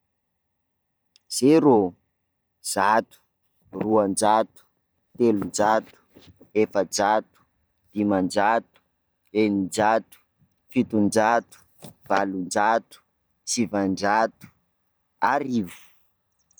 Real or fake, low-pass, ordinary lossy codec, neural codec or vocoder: fake; none; none; vocoder, 44.1 kHz, 128 mel bands every 512 samples, BigVGAN v2